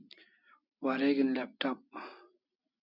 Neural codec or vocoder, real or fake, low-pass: none; real; 5.4 kHz